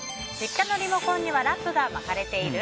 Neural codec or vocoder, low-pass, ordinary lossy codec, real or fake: none; none; none; real